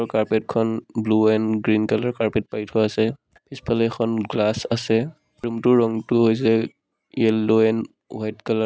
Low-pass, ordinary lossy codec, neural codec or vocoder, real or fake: none; none; none; real